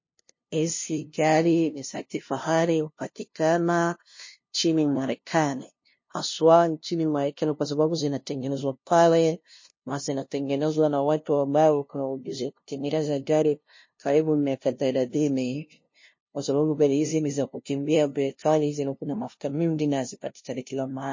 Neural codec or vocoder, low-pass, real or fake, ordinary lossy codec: codec, 16 kHz, 0.5 kbps, FunCodec, trained on LibriTTS, 25 frames a second; 7.2 kHz; fake; MP3, 32 kbps